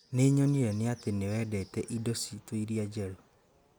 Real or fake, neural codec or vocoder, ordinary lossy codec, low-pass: real; none; none; none